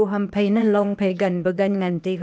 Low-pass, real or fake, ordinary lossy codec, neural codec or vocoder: none; fake; none; codec, 16 kHz, 0.8 kbps, ZipCodec